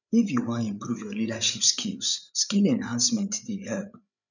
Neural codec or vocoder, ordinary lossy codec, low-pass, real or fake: codec, 16 kHz, 8 kbps, FreqCodec, larger model; none; 7.2 kHz; fake